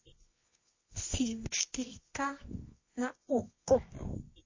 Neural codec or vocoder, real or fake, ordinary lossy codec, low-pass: codec, 24 kHz, 0.9 kbps, WavTokenizer, medium music audio release; fake; MP3, 32 kbps; 7.2 kHz